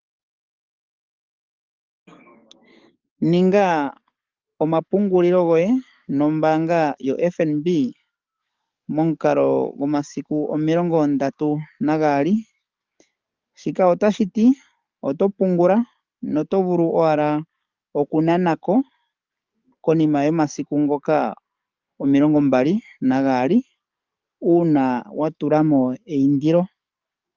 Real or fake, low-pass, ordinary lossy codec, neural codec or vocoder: fake; 7.2 kHz; Opus, 24 kbps; codec, 44.1 kHz, 7.8 kbps, DAC